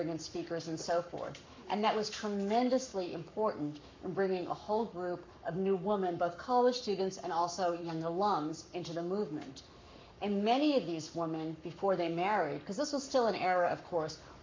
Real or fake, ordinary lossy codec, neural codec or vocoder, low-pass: fake; MP3, 64 kbps; codec, 44.1 kHz, 7.8 kbps, Pupu-Codec; 7.2 kHz